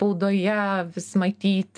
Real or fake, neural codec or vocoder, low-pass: real; none; 9.9 kHz